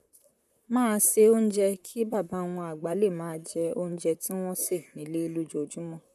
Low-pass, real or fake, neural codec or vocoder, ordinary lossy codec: 14.4 kHz; fake; vocoder, 44.1 kHz, 128 mel bands, Pupu-Vocoder; none